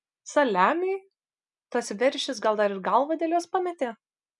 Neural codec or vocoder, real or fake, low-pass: none; real; 10.8 kHz